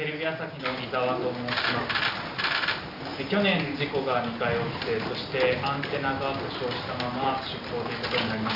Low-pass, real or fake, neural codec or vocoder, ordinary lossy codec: 5.4 kHz; real; none; none